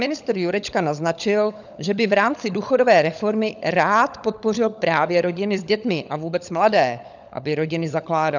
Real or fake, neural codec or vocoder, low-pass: fake; codec, 16 kHz, 16 kbps, FunCodec, trained on LibriTTS, 50 frames a second; 7.2 kHz